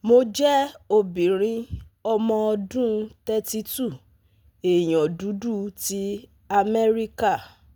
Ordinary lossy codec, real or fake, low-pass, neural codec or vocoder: none; real; none; none